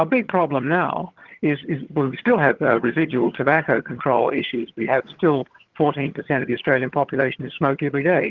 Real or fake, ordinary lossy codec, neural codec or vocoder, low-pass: fake; Opus, 16 kbps; vocoder, 22.05 kHz, 80 mel bands, HiFi-GAN; 7.2 kHz